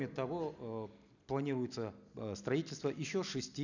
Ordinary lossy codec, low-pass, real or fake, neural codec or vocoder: none; 7.2 kHz; real; none